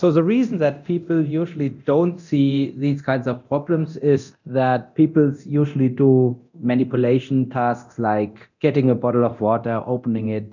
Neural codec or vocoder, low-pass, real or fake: codec, 24 kHz, 0.9 kbps, DualCodec; 7.2 kHz; fake